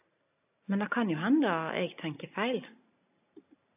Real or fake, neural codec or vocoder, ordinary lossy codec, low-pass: real; none; AAC, 32 kbps; 3.6 kHz